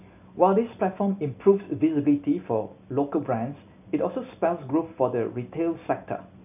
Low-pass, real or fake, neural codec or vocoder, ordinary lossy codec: 3.6 kHz; real; none; none